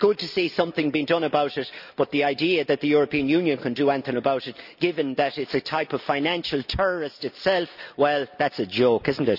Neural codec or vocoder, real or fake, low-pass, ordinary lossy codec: none; real; 5.4 kHz; none